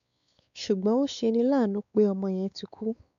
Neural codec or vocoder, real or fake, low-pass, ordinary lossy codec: codec, 16 kHz, 4 kbps, X-Codec, WavLM features, trained on Multilingual LibriSpeech; fake; 7.2 kHz; none